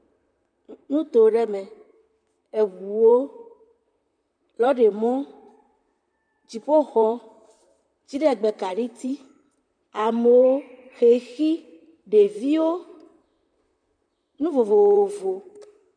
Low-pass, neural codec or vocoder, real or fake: 9.9 kHz; vocoder, 44.1 kHz, 128 mel bands, Pupu-Vocoder; fake